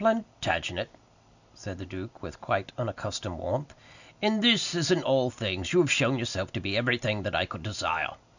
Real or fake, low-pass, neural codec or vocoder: real; 7.2 kHz; none